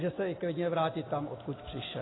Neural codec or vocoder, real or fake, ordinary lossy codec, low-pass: none; real; AAC, 16 kbps; 7.2 kHz